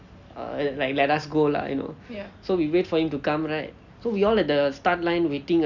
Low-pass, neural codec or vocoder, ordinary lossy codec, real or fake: 7.2 kHz; none; none; real